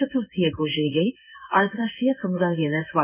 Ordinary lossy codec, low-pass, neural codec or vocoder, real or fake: none; 3.6 kHz; codec, 16 kHz in and 24 kHz out, 1 kbps, XY-Tokenizer; fake